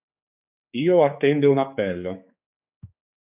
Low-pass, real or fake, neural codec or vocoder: 3.6 kHz; fake; codec, 16 kHz, 4 kbps, X-Codec, HuBERT features, trained on general audio